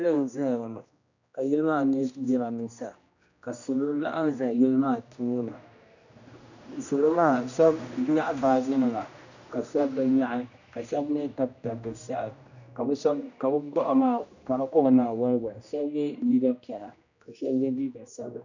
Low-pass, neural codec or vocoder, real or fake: 7.2 kHz; codec, 16 kHz, 1 kbps, X-Codec, HuBERT features, trained on general audio; fake